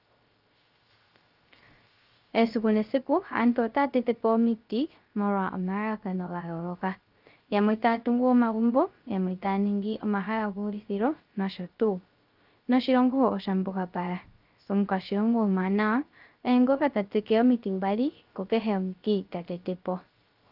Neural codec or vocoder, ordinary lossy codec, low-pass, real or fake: codec, 16 kHz, 0.3 kbps, FocalCodec; Opus, 24 kbps; 5.4 kHz; fake